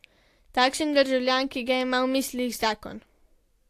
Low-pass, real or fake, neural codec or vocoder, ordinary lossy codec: 14.4 kHz; real; none; AAC, 64 kbps